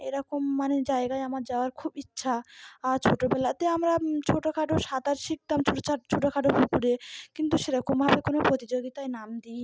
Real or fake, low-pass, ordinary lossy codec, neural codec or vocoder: real; none; none; none